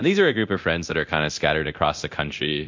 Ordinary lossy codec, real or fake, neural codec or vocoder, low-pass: MP3, 48 kbps; fake; codec, 24 kHz, 0.9 kbps, DualCodec; 7.2 kHz